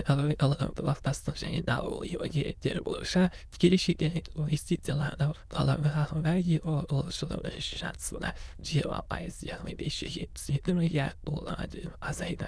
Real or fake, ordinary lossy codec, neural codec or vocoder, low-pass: fake; none; autoencoder, 22.05 kHz, a latent of 192 numbers a frame, VITS, trained on many speakers; none